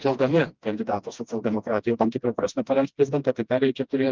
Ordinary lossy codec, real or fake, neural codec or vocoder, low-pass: Opus, 32 kbps; fake; codec, 16 kHz, 1 kbps, FreqCodec, smaller model; 7.2 kHz